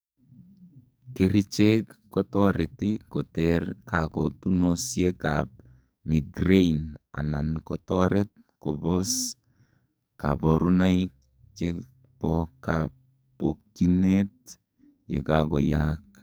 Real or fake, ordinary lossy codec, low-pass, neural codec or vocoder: fake; none; none; codec, 44.1 kHz, 2.6 kbps, SNAC